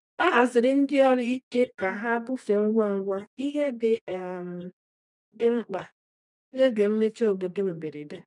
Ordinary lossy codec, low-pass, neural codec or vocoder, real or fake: none; 10.8 kHz; codec, 24 kHz, 0.9 kbps, WavTokenizer, medium music audio release; fake